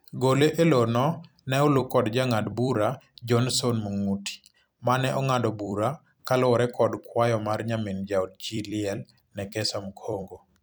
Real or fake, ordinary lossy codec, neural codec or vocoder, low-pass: fake; none; vocoder, 44.1 kHz, 128 mel bands every 256 samples, BigVGAN v2; none